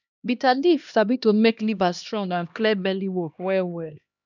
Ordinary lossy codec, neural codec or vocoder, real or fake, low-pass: none; codec, 16 kHz, 1 kbps, X-Codec, HuBERT features, trained on LibriSpeech; fake; 7.2 kHz